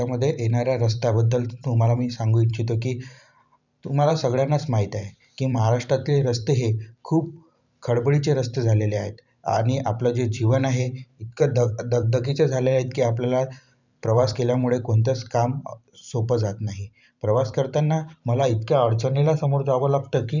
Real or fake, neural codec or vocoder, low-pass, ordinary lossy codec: fake; vocoder, 44.1 kHz, 128 mel bands every 512 samples, BigVGAN v2; 7.2 kHz; none